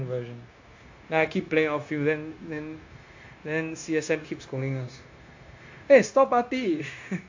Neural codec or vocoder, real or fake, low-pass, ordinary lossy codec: codec, 16 kHz, 0.9 kbps, LongCat-Audio-Codec; fake; 7.2 kHz; AAC, 48 kbps